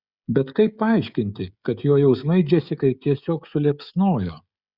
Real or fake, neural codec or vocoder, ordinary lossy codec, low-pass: fake; codec, 16 kHz, 16 kbps, FreqCodec, smaller model; Opus, 64 kbps; 5.4 kHz